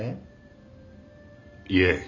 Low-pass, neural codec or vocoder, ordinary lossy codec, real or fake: 7.2 kHz; none; none; real